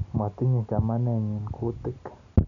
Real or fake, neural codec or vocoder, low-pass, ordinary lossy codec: real; none; 7.2 kHz; none